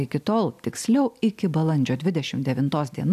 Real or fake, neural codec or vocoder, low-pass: real; none; 14.4 kHz